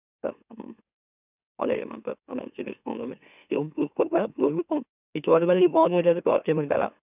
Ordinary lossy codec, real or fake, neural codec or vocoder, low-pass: none; fake; autoencoder, 44.1 kHz, a latent of 192 numbers a frame, MeloTTS; 3.6 kHz